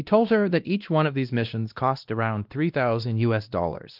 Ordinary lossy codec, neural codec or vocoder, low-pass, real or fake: Opus, 24 kbps; codec, 16 kHz, 1 kbps, X-Codec, WavLM features, trained on Multilingual LibriSpeech; 5.4 kHz; fake